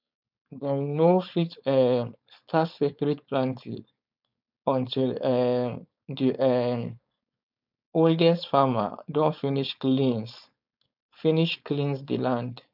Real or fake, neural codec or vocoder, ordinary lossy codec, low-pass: fake; codec, 16 kHz, 4.8 kbps, FACodec; none; 5.4 kHz